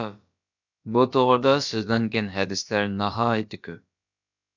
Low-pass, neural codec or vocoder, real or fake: 7.2 kHz; codec, 16 kHz, about 1 kbps, DyCAST, with the encoder's durations; fake